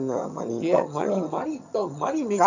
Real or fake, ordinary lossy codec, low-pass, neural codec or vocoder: fake; none; 7.2 kHz; vocoder, 22.05 kHz, 80 mel bands, HiFi-GAN